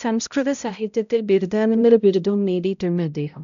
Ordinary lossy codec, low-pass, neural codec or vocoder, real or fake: MP3, 96 kbps; 7.2 kHz; codec, 16 kHz, 0.5 kbps, X-Codec, HuBERT features, trained on balanced general audio; fake